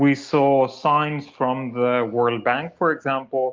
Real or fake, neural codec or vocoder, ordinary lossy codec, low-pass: real; none; Opus, 32 kbps; 7.2 kHz